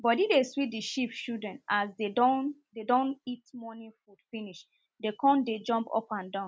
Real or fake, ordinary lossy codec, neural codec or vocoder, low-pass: real; none; none; none